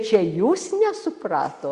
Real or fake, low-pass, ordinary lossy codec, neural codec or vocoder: real; 10.8 kHz; Opus, 64 kbps; none